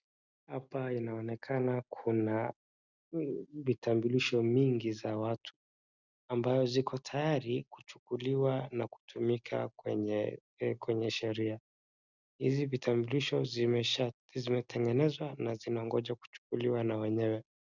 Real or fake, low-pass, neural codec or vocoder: real; 7.2 kHz; none